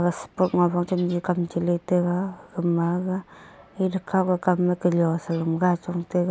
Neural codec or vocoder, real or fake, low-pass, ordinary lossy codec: none; real; none; none